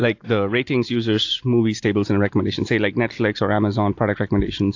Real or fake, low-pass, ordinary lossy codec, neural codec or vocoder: real; 7.2 kHz; AAC, 48 kbps; none